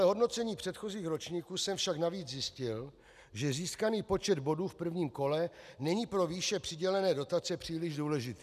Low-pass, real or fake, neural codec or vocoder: 14.4 kHz; real; none